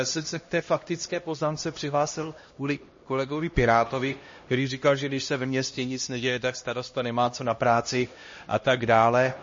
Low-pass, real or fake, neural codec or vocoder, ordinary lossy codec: 7.2 kHz; fake; codec, 16 kHz, 1 kbps, X-Codec, HuBERT features, trained on LibriSpeech; MP3, 32 kbps